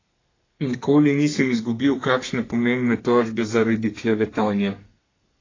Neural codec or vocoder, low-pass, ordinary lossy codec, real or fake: codec, 32 kHz, 1.9 kbps, SNAC; 7.2 kHz; AAC, 32 kbps; fake